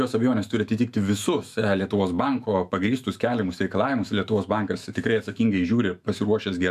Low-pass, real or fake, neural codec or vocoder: 14.4 kHz; fake; autoencoder, 48 kHz, 128 numbers a frame, DAC-VAE, trained on Japanese speech